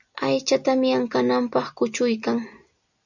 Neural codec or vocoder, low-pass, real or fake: none; 7.2 kHz; real